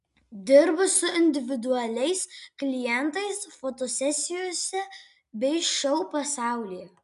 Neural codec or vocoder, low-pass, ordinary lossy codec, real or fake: none; 10.8 kHz; MP3, 96 kbps; real